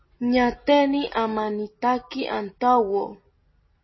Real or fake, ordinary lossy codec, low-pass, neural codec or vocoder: real; MP3, 24 kbps; 7.2 kHz; none